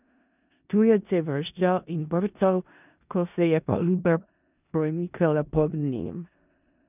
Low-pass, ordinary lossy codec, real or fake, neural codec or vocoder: 3.6 kHz; none; fake; codec, 16 kHz in and 24 kHz out, 0.4 kbps, LongCat-Audio-Codec, four codebook decoder